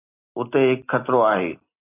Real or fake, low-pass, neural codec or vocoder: real; 3.6 kHz; none